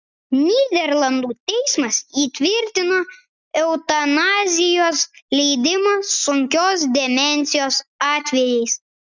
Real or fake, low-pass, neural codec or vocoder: real; 7.2 kHz; none